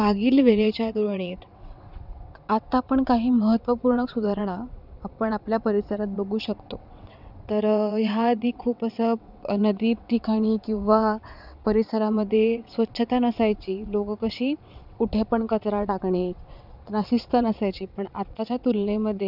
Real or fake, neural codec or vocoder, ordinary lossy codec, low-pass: fake; vocoder, 22.05 kHz, 80 mel bands, WaveNeXt; none; 5.4 kHz